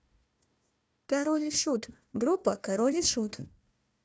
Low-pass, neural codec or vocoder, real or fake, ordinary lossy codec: none; codec, 16 kHz, 1 kbps, FunCodec, trained on Chinese and English, 50 frames a second; fake; none